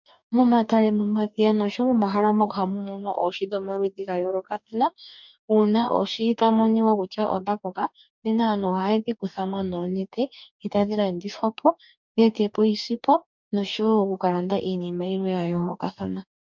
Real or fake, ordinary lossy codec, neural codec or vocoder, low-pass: fake; MP3, 64 kbps; codec, 44.1 kHz, 2.6 kbps, DAC; 7.2 kHz